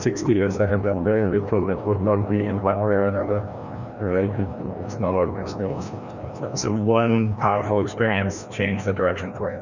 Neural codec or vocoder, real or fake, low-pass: codec, 16 kHz, 1 kbps, FreqCodec, larger model; fake; 7.2 kHz